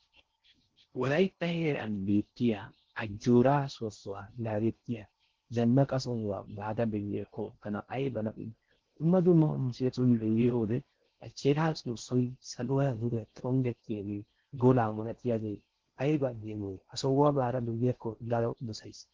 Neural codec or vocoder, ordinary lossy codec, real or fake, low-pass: codec, 16 kHz in and 24 kHz out, 0.6 kbps, FocalCodec, streaming, 4096 codes; Opus, 16 kbps; fake; 7.2 kHz